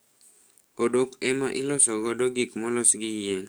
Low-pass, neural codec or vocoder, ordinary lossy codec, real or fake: none; codec, 44.1 kHz, 7.8 kbps, DAC; none; fake